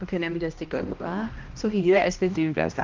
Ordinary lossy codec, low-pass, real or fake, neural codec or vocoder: Opus, 32 kbps; 7.2 kHz; fake; codec, 16 kHz, 1 kbps, X-Codec, HuBERT features, trained on balanced general audio